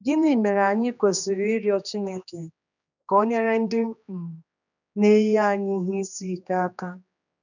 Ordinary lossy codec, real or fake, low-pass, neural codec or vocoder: none; fake; 7.2 kHz; codec, 16 kHz, 2 kbps, X-Codec, HuBERT features, trained on general audio